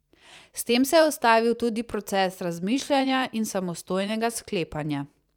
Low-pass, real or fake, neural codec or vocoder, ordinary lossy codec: 19.8 kHz; fake; vocoder, 44.1 kHz, 128 mel bands every 512 samples, BigVGAN v2; none